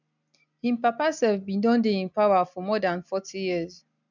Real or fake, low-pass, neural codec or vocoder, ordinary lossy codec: real; 7.2 kHz; none; none